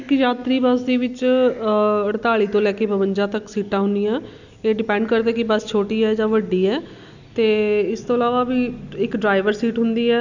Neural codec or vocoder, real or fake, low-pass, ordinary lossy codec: none; real; 7.2 kHz; none